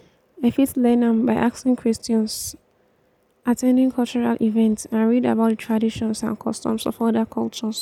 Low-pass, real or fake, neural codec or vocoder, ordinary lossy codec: 19.8 kHz; real; none; none